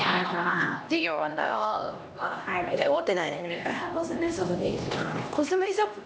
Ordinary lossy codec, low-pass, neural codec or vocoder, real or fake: none; none; codec, 16 kHz, 1 kbps, X-Codec, HuBERT features, trained on LibriSpeech; fake